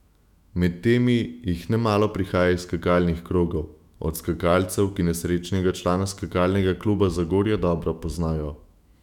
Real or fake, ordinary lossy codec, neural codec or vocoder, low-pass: fake; none; autoencoder, 48 kHz, 128 numbers a frame, DAC-VAE, trained on Japanese speech; 19.8 kHz